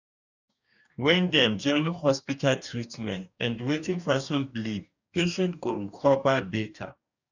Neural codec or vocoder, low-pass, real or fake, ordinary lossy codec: codec, 44.1 kHz, 2.6 kbps, DAC; 7.2 kHz; fake; none